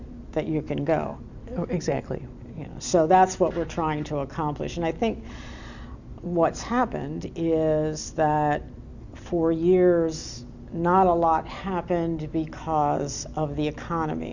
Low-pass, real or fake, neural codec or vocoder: 7.2 kHz; real; none